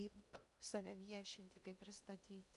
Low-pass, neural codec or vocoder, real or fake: 10.8 kHz; codec, 16 kHz in and 24 kHz out, 0.8 kbps, FocalCodec, streaming, 65536 codes; fake